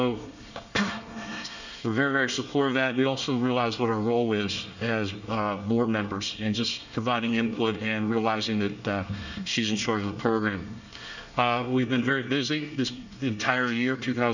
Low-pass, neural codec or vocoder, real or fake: 7.2 kHz; codec, 24 kHz, 1 kbps, SNAC; fake